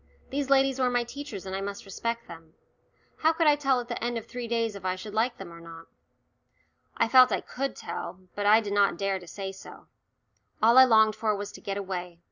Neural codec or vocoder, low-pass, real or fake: none; 7.2 kHz; real